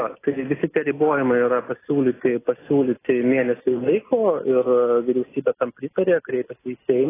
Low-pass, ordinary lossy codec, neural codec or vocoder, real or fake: 3.6 kHz; AAC, 16 kbps; none; real